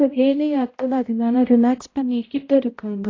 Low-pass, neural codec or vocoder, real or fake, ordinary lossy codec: 7.2 kHz; codec, 16 kHz, 0.5 kbps, X-Codec, HuBERT features, trained on balanced general audio; fake; AAC, 32 kbps